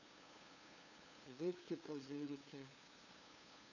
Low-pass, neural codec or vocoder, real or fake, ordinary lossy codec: 7.2 kHz; codec, 16 kHz, 2 kbps, FunCodec, trained on LibriTTS, 25 frames a second; fake; none